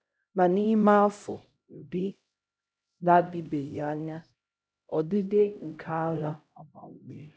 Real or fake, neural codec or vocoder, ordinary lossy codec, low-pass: fake; codec, 16 kHz, 0.5 kbps, X-Codec, HuBERT features, trained on LibriSpeech; none; none